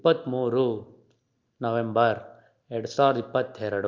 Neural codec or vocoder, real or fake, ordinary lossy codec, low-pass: none; real; Opus, 24 kbps; 7.2 kHz